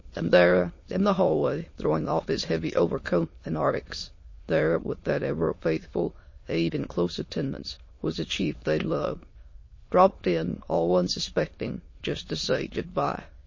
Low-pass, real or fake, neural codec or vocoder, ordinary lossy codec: 7.2 kHz; fake; autoencoder, 22.05 kHz, a latent of 192 numbers a frame, VITS, trained on many speakers; MP3, 32 kbps